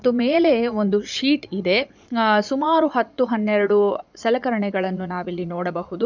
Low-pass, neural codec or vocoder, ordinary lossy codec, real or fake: 7.2 kHz; vocoder, 22.05 kHz, 80 mel bands, Vocos; none; fake